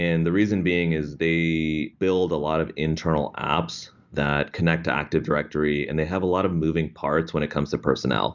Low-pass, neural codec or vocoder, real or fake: 7.2 kHz; none; real